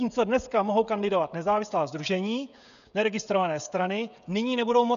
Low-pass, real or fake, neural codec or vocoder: 7.2 kHz; fake; codec, 16 kHz, 16 kbps, FreqCodec, smaller model